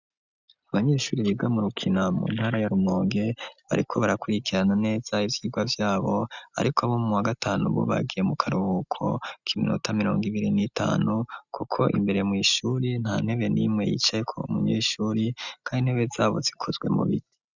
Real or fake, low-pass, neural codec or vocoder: real; 7.2 kHz; none